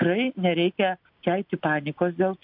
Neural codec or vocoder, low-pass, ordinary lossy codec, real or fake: none; 5.4 kHz; MP3, 48 kbps; real